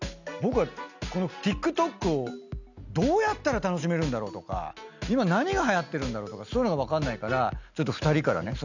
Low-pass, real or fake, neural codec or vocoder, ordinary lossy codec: 7.2 kHz; real; none; none